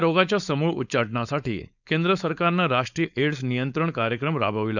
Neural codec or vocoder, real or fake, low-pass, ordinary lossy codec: codec, 16 kHz, 4.8 kbps, FACodec; fake; 7.2 kHz; none